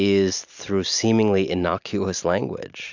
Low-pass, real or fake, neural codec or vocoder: 7.2 kHz; real; none